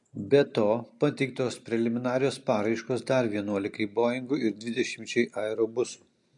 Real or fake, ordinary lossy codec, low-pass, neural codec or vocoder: real; MP3, 64 kbps; 10.8 kHz; none